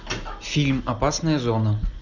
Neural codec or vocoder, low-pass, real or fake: none; 7.2 kHz; real